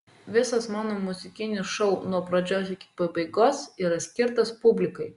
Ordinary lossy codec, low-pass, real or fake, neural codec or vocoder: Opus, 64 kbps; 10.8 kHz; real; none